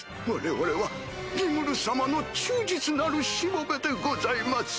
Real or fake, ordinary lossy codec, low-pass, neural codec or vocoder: real; none; none; none